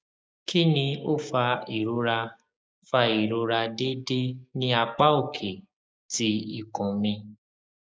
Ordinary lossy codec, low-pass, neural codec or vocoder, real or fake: none; none; codec, 16 kHz, 6 kbps, DAC; fake